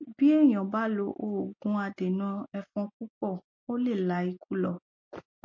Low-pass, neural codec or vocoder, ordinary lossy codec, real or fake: 7.2 kHz; none; MP3, 32 kbps; real